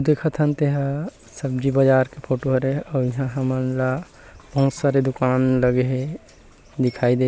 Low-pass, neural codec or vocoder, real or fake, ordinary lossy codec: none; none; real; none